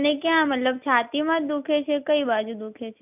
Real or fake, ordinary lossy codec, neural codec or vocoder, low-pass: real; none; none; 3.6 kHz